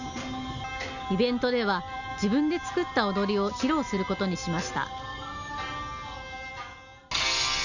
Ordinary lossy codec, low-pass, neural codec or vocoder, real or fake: none; 7.2 kHz; none; real